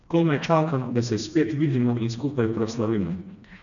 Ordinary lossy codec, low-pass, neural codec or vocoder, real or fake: none; 7.2 kHz; codec, 16 kHz, 1 kbps, FreqCodec, smaller model; fake